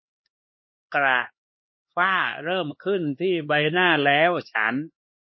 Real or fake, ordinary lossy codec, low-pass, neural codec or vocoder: fake; MP3, 24 kbps; 7.2 kHz; codec, 16 kHz, 4 kbps, X-Codec, HuBERT features, trained on LibriSpeech